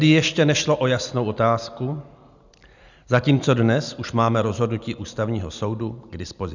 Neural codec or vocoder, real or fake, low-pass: none; real; 7.2 kHz